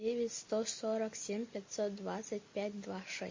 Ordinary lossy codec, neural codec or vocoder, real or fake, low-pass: MP3, 32 kbps; none; real; 7.2 kHz